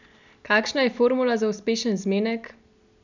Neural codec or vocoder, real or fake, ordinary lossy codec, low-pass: none; real; none; 7.2 kHz